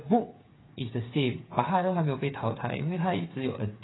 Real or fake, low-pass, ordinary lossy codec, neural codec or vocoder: fake; 7.2 kHz; AAC, 16 kbps; codec, 16 kHz, 8 kbps, FreqCodec, smaller model